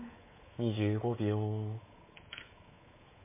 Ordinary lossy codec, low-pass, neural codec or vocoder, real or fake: MP3, 16 kbps; 3.6 kHz; codec, 24 kHz, 3.1 kbps, DualCodec; fake